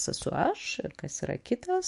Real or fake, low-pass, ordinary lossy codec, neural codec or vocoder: fake; 14.4 kHz; MP3, 48 kbps; autoencoder, 48 kHz, 128 numbers a frame, DAC-VAE, trained on Japanese speech